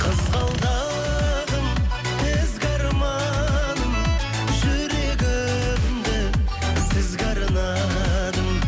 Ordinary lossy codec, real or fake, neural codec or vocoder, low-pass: none; real; none; none